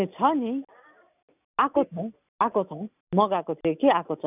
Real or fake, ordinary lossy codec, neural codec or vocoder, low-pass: real; none; none; 3.6 kHz